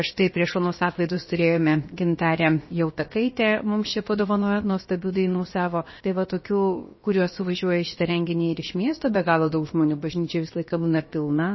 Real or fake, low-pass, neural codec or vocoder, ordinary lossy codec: fake; 7.2 kHz; codec, 16 kHz, about 1 kbps, DyCAST, with the encoder's durations; MP3, 24 kbps